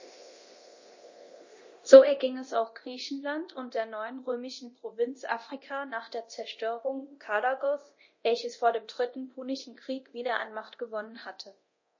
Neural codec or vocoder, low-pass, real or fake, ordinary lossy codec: codec, 24 kHz, 0.9 kbps, DualCodec; 7.2 kHz; fake; MP3, 32 kbps